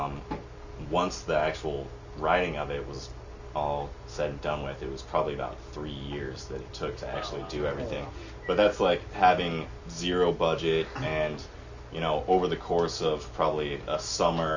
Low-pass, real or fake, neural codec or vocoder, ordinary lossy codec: 7.2 kHz; real; none; Opus, 64 kbps